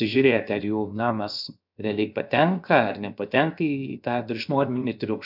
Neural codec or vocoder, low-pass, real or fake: codec, 16 kHz, 0.7 kbps, FocalCodec; 5.4 kHz; fake